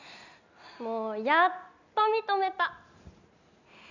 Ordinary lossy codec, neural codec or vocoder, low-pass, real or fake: none; none; 7.2 kHz; real